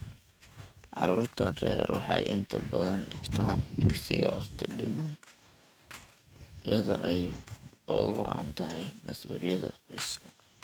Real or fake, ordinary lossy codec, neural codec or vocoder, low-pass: fake; none; codec, 44.1 kHz, 2.6 kbps, DAC; none